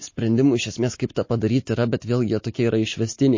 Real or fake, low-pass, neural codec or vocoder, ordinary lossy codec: real; 7.2 kHz; none; MP3, 32 kbps